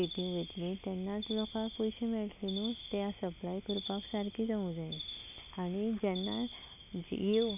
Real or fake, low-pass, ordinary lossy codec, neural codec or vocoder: real; 3.6 kHz; none; none